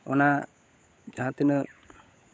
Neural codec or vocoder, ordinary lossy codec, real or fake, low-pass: codec, 16 kHz, 16 kbps, FunCodec, trained on LibriTTS, 50 frames a second; none; fake; none